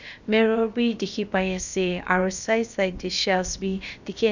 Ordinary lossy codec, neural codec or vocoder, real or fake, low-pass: none; codec, 16 kHz, about 1 kbps, DyCAST, with the encoder's durations; fake; 7.2 kHz